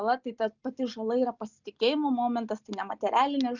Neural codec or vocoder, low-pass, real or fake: none; 7.2 kHz; real